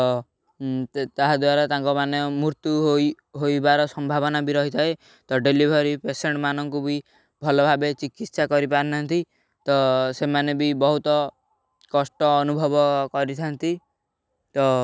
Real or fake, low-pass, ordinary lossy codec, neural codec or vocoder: real; none; none; none